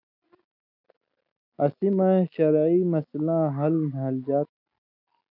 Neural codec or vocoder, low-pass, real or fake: none; 5.4 kHz; real